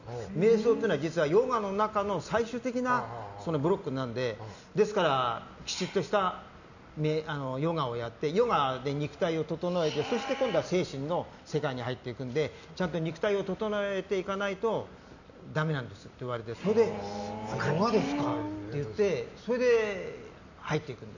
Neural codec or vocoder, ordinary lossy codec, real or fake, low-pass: none; none; real; 7.2 kHz